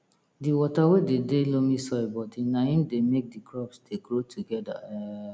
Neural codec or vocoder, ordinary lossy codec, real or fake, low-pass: none; none; real; none